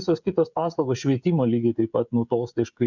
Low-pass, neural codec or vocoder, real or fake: 7.2 kHz; codec, 16 kHz, 16 kbps, FreqCodec, smaller model; fake